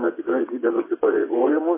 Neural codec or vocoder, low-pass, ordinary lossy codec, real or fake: vocoder, 22.05 kHz, 80 mel bands, WaveNeXt; 3.6 kHz; MP3, 16 kbps; fake